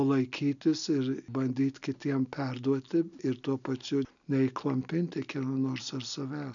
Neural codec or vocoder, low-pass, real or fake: none; 7.2 kHz; real